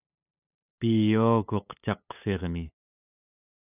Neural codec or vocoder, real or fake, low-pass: codec, 16 kHz, 8 kbps, FunCodec, trained on LibriTTS, 25 frames a second; fake; 3.6 kHz